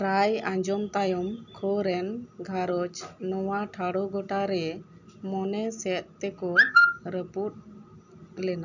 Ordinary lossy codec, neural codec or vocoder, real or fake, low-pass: none; none; real; 7.2 kHz